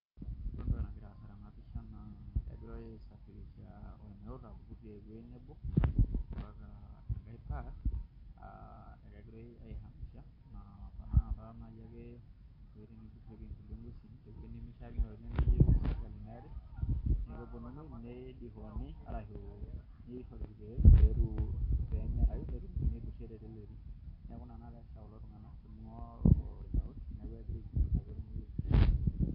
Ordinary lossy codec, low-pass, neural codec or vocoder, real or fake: MP3, 32 kbps; 5.4 kHz; none; real